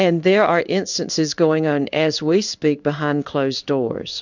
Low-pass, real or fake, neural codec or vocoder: 7.2 kHz; fake; codec, 16 kHz in and 24 kHz out, 1 kbps, XY-Tokenizer